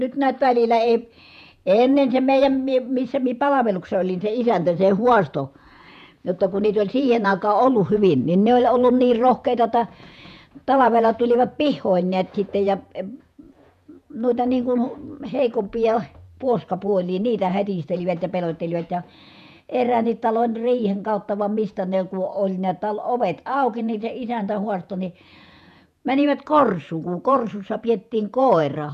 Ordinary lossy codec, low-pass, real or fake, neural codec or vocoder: none; 14.4 kHz; fake; vocoder, 48 kHz, 128 mel bands, Vocos